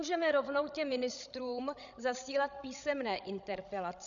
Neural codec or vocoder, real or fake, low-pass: codec, 16 kHz, 16 kbps, FreqCodec, larger model; fake; 7.2 kHz